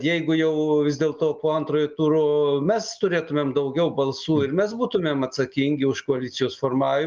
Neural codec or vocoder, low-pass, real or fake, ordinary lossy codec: none; 7.2 kHz; real; Opus, 24 kbps